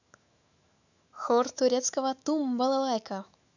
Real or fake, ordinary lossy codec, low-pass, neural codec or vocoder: fake; none; 7.2 kHz; autoencoder, 48 kHz, 128 numbers a frame, DAC-VAE, trained on Japanese speech